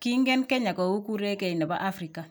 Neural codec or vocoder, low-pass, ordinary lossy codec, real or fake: none; none; none; real